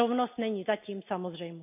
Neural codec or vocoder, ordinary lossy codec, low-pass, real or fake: none; none; 3.6 kHz; real